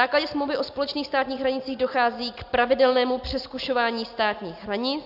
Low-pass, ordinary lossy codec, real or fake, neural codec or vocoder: 5.4 kHz; AAC, 48 kbps; real; none